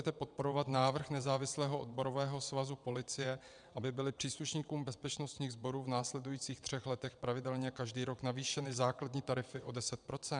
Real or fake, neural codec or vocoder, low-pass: fake; vocoder, 22.05 kHz, 80 mel bands, WaveNeXt; 9.9 kHz